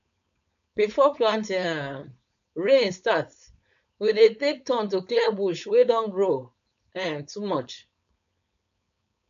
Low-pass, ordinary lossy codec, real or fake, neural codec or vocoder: 7.2 kHz; AAC, 96 kbps; fake; codec, 16 kHz, 4.8 kbps, FACodec